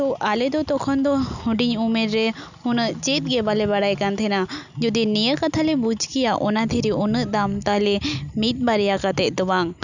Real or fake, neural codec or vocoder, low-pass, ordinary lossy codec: real; none; 7.2 kHz; none